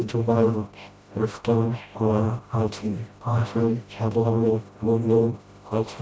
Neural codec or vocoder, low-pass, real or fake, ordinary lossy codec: codec, 16 kHz, 0.5 kbps, FreqCodec, smaller model; none; fake; none